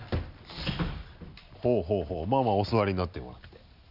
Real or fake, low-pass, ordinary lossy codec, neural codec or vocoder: real; 5.4 kHz; none; none